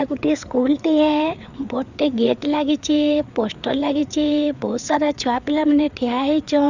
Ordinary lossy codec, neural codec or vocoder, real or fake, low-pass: none; codec, 16 kHz, 4 kbps, FreqCodec, larger model; fake; 7.2 kHz